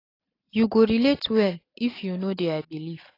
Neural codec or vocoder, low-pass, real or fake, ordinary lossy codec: none; 5.4 kHz; real; AAC, 24 kbps